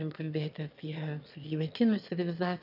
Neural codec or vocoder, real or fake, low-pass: autoencoder, 22.05 kHz, a latent of 192 numbers a frame, VITS, trained on one speaker; fake; 5.4 kHz